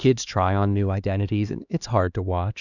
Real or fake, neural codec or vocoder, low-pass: fake; codec, 16 kHz, 2 kbps, X-Codec, HuBERT features, trained on LibriSpeech; 7.2 kHz